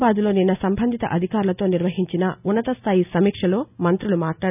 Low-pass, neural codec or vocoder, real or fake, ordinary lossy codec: 3.6 kHz; none; real; none